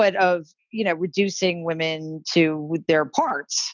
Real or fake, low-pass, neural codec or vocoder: real; 7.2 kHz; none